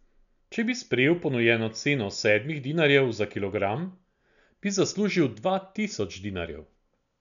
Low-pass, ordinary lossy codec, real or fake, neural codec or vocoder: 7.2 kHz; none; real; none